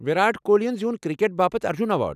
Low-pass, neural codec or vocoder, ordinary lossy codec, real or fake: 14.4 kHz; none; none; real